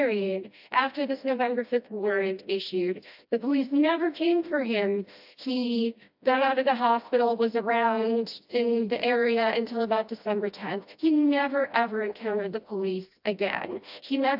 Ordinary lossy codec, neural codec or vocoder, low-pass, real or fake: AAC, 48 kbps; codec, 16 kHz, 1 kbps, FreqCodec, smaller model; 5.4 kHz; fake